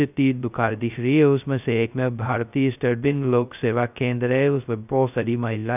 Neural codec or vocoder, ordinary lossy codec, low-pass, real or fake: codec, 16 kHz, 0.2 kbps, FocalCodec; none; 3.6 kHz; fake